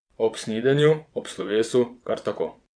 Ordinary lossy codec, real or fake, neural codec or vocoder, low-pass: none; fake; vocoder, 22.05 kHz, 80 mel bands, WaveNeXt; 9.9 kHz